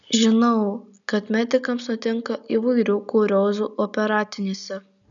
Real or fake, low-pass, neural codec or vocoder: real; 7.2 kHz; none